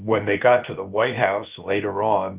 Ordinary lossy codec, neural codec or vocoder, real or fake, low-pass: Opus, 32 kbps; codec, 16 kHz, about 1 kbps, DyCAST, with the encoder's durations; fake; 3.6 kHz